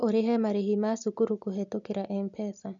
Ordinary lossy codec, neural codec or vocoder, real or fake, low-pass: none; none; real; 7.2 kHz